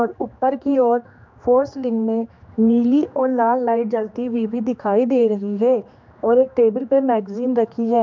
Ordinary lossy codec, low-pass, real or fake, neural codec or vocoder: MP3, 64 kbps; 7.2 kHz; fake; codec, 16 kHz, 2 kbps, X-Codec, HuBERT features, trained on general audio